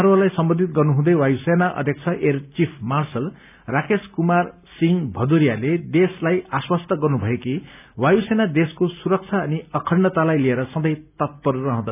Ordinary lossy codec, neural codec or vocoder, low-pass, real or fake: none; none; 3.6 kHz; real